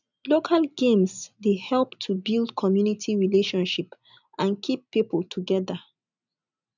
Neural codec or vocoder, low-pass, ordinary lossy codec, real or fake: none; 7.2 kHz; none; real